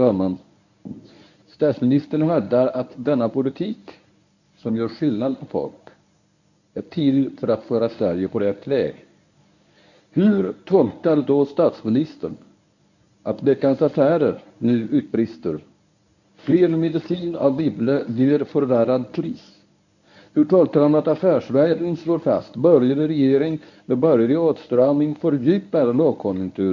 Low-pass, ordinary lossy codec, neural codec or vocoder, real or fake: 7.2 kHz; none; codec, 24 kHz, 0.9 kbps, WavTokenizer, medium speech release version 1; fake